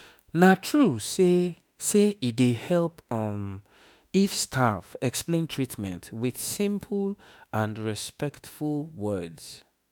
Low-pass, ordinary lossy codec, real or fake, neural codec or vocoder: none; none; fake; autoencoder, 48 kHz, 32 numbers a frame, DAC-VAE, trained on Japanese speech